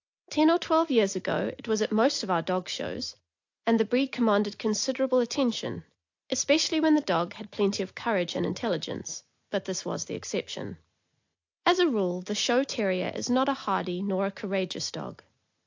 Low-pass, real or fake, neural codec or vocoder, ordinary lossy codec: 7.2 kHz; real; none; AAC, 48 kbps